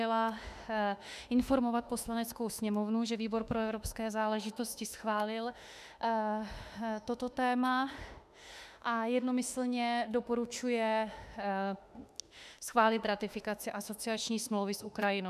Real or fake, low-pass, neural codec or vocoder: fake; 14.4 kHz; autoencoder, 48 kHz, 32 numbers a frame, DAC-VAE, trained on Japanese speech